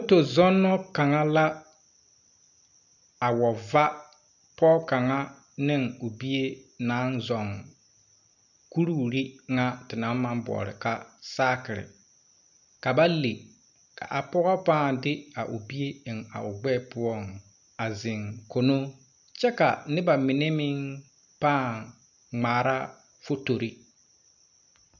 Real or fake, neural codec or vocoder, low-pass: real; none; 7.2 kHz